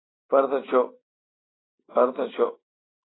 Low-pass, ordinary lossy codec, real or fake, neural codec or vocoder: 7.2 kHz; AAC, 16 kbps; real; none